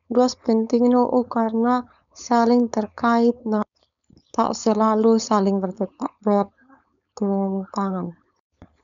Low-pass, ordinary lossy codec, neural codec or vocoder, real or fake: 7.2 kHz; none; codec, 16 kHz, 4.8 kbps, FACodec; fake